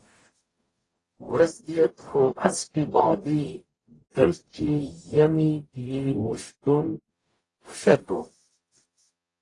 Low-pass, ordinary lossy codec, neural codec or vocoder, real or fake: 10.8 kHz; AAC, 32 kbps; codec, 44.1 kHz, 0.9 kbps, DAC; fake